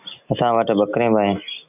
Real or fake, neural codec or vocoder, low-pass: real; none; 3.6 kHz